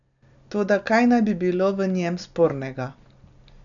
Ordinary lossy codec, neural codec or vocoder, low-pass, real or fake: none; none; 7.2 kHz; real